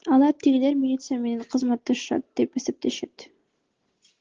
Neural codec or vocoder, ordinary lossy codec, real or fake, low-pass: none; Opus, 16 kbps; real; 7.2 kHz